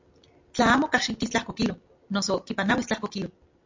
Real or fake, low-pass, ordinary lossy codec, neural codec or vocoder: real; 7.2 kHz; MP3, 48 kbps; none